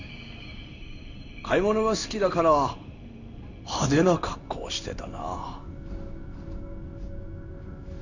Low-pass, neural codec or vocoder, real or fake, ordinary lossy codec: 7.2 kHz; codec, 16 kHz in and 24 kHz out, 1 kbps, XY-Tokenizer; fake; none